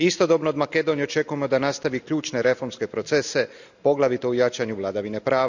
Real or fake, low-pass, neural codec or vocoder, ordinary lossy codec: real; 7.2 kHz; none; none